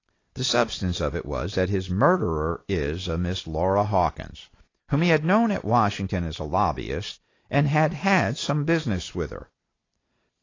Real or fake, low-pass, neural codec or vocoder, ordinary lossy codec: real; 7.2 kHz; none; AAC, 32 kbps